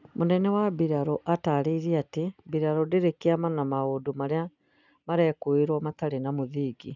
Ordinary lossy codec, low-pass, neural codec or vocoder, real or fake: none; 7.2 kHz; none; real